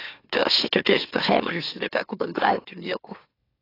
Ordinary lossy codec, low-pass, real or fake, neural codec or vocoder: AAC, 24 kbps; 5.4 kHz; fake; autoencoder, 44.1 kHz, a latent of 192 numbers a frame, MeloTTS